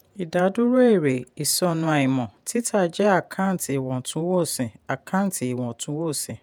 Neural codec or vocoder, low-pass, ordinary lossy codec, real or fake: vocoder, 48 kHz, 128 mel bands, Vocos; none; none; fake